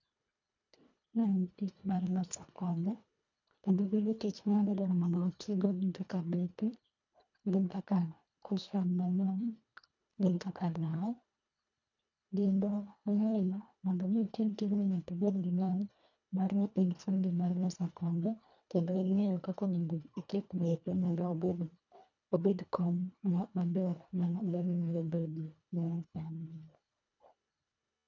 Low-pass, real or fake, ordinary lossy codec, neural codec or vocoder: 7.2 kHz; fake; none; codec, 24 kHz, 1.5 kbps, HILCodec